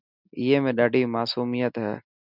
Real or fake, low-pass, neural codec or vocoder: real; 5.4 kHz; none